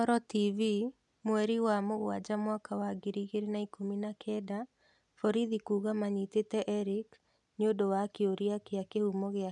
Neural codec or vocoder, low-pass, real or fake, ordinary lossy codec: none; 10.8 kHz; real; none